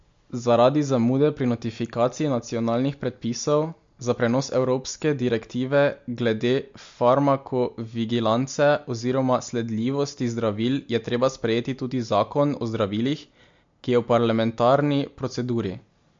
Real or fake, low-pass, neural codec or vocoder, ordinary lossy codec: real; 7.2 kHz; none; MP3, 48 kbps